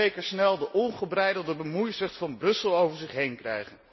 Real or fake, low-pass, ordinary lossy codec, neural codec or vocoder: real; 7.2 kHz; MP3, 24 kbps; none